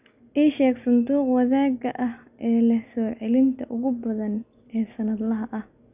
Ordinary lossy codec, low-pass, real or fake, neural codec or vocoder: none; 3.6 kHz; real; none